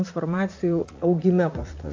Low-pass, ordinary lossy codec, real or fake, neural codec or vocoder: 7.2 kHz; AAC, 48 kbps; fake; vocoder, 44.1 kHz, 80 mel bands, Vocos